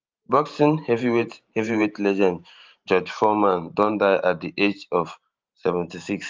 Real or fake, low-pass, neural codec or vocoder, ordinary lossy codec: fake; 7.2 kHz; vocoder, 44.1 kHz, 128 mel bands every 512 samples, BigVGAN v2; Opus, 32 kbps